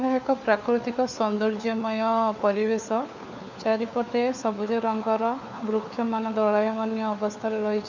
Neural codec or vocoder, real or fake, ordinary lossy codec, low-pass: codec, 16 kHz, 4 kbps, FunCodec, trained on LibriTTS, 50 frames a second; fake; none; 7.2 kHz